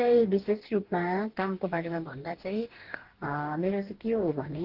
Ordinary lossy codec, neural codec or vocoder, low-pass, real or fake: Opus, 16 kbps; codec, 44.1 kHz, 2.6 kbps, DAC; 5.4 kHz; fake